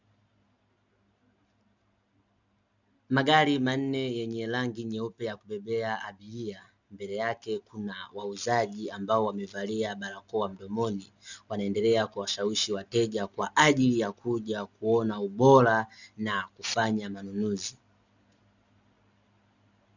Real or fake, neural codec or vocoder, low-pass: real; none; 7.2 kHz